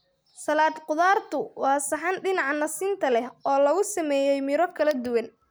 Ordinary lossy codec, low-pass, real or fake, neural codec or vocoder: none; none; real; none